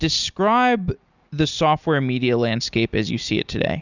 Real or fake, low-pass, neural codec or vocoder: real; 7.2 kHz; none